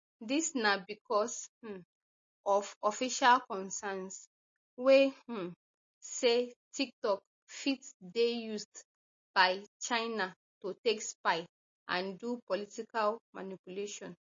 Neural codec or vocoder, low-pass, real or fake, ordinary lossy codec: none; 7.2 kHz; real; MP3, 32 kbps